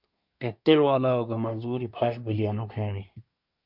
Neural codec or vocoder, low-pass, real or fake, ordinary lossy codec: codec, 24 kHz, 1 kbps, SNAC; 5.4 kHz; fake; MP3, 48 kbps